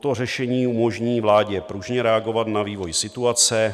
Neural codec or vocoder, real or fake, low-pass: none; real; 14.4 kHz